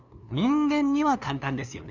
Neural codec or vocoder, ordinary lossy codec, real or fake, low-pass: codec, 16 kHz, 2 kbps, FunCodec, trained on LibriTTS, 25 frames a second; Opus, 64 kbps; fake; 7.2 kHz